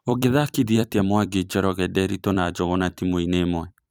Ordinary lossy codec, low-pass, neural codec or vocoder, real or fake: none; none; vocoder, 44.1 kHz, 128 mel bands every 256 samples, BigVGAN v2; fake